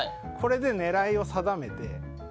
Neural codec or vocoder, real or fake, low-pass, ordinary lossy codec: none; real; none; none